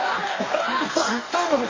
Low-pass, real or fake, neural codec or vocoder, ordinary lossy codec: 7.2 kHz; fake; codec, 44.1 kHz, 2.6 kbps, DAC; MP3, 32 kbps